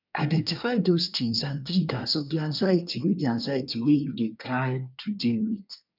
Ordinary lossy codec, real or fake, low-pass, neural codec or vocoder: none; fake; 5.4 kHz; codec, 24 kHz, 1 kbps, SNAC